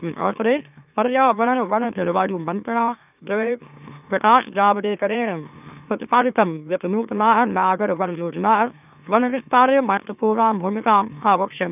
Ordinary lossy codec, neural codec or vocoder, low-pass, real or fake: none; autoencoder, 44.1 kHz, a latent of 192 numbers a frame, MeloTTS; 3.6 kHz; fake